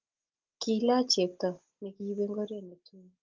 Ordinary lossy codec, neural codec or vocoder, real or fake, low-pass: Opus, 24 kbps; none; real; 7.2 kHz